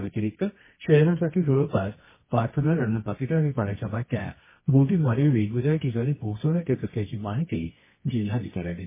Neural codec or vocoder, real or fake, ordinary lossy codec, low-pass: codec, 24 kHz, 0.9 kbps, WavTokenizer, medium music audio release; fake; MP3, 16 kbps; 3.6 kHz